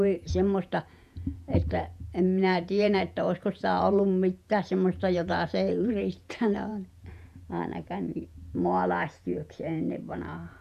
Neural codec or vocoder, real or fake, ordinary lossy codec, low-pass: vocoder, 48 kHz, 128 mel bands, Vocos; fake; none; 14.4 kHz